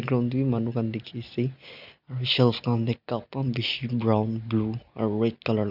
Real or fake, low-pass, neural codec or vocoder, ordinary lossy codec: real; 5.4 kHz; none; none